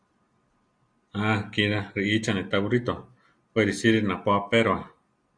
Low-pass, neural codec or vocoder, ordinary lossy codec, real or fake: 9.9 kHz; none; Opus, 64 kbps; real